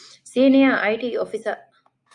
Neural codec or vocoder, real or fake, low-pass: none; real; 10.8 kHz